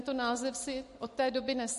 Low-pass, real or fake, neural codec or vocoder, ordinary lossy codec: 14.4 kHz; real; none; MP3, 48 kbps